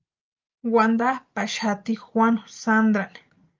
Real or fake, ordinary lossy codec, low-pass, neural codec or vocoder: real; Opus, 24 kbps; 7.2 kHz; none